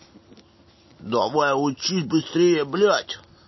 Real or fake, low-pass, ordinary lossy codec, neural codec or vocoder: real; 7.2 kHz; MP3, 24 kbps; none